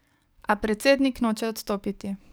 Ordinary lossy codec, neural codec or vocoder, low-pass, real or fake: none; codec, 44.1 kHz, 7.8 kbps, DAC; none; fake